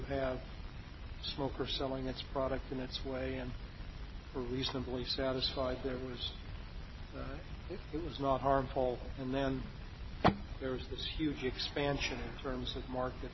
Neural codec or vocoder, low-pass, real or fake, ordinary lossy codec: none; 7.2 kHz; real; MP3, 24 kbps